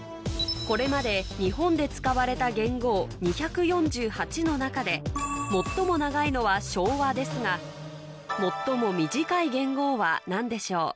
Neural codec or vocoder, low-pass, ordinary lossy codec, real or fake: none; none; none; real